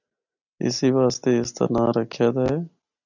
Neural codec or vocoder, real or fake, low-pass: none; real; 7.2 kHz